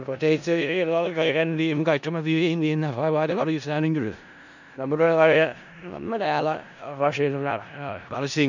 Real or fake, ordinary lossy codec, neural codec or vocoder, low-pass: fake; none; codec, 16 kHz in and 24 kHz out, 0.4 kbps, LongCat-Audio-Codec, four codebook decoder; 7.2 kHz